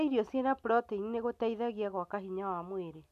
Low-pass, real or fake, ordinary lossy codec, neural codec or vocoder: 14.4 kHz; real; none; none